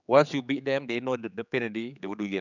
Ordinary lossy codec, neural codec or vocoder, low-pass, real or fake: none; codec, 16 kHz, 4 kbps, X-Codec, HuBERT features, trained on general audio; 7.2 kHz; fake